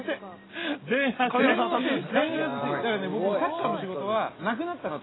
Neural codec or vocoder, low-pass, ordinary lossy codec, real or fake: none; 7.2 kHz; AAC, 16 kbps; real